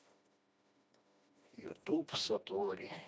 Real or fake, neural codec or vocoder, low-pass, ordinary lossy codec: fake; codec, 16 kHz, 1 kbps, FreqCodec, smaller model; none; none